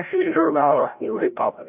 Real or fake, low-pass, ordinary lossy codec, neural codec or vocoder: fake; 3.6 kHz; AAC, 32 kbps; codec, 16 kHz, 0.5 kbps, FreqCodec, larger model